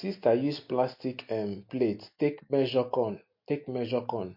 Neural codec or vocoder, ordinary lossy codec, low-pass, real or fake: none; MP3, 32 kbps; 5.4 kHz; real